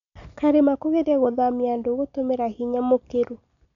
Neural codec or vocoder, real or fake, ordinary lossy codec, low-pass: none; real; none; 7.2 kHz